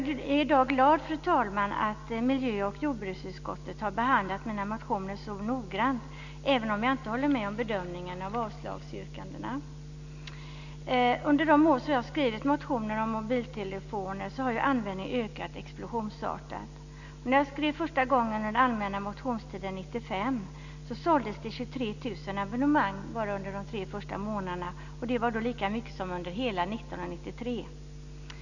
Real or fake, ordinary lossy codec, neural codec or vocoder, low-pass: real; none; none; 7.2 kHz